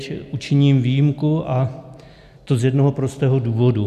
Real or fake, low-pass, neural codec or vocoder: real; 14.4 kHz; none